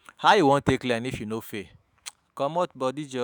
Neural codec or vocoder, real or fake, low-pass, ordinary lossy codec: autoencoder, 48 kHz, 128 numbers a frame, DAC-VAE, trained on Japanese speech; fake; none; none